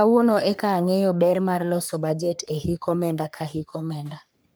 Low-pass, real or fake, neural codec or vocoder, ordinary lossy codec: none; fake; codec, 44.1 kHz, 3.4 kbps, Pupu-Codec; none